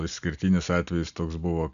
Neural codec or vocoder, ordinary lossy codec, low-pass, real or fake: none; AAC, 96 kbps; 7.2 kHz; real